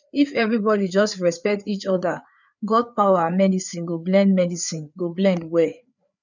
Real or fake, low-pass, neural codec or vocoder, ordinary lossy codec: fake; 7.2 kHz; codec, 16 kHz, 4 kbps, FreqCodec, larger model; none